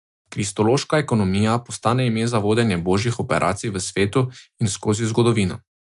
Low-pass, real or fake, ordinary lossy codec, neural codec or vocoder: 10.8 kHz; real; AAC, 96 kbps; none